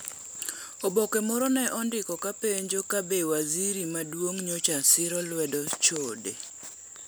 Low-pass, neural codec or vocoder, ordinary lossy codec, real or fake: none; none; none; real